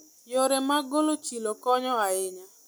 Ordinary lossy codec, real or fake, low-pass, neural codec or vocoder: none; real; none; none